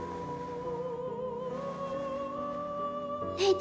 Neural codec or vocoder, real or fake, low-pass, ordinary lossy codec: none; real; none; none